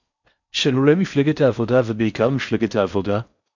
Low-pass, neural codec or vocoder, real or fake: 7.2 kHz; codec, 16 kHz in and 24 kHz out, 0.6 kbps, FocalCodec, streaming, 4096 codes; fake